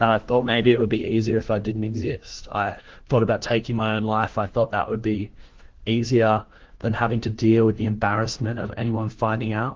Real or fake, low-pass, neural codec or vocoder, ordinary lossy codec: fake; 7.2 kHz; codec, 16 kHz, 1 kbps, FunCodec, trained on LibriTTS, 50 frames a second; Opus, 16 kbps